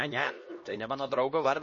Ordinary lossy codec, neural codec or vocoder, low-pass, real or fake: MP3, 32 kbps; codec, 16 kHz, 1 kbps, X-Codec, HuBERT features, trained on LibriSpeech; 7.2 kHz; fake